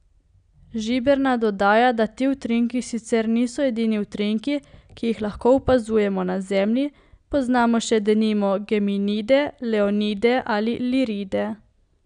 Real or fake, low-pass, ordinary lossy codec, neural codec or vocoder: real; 9.9 kHz; none; none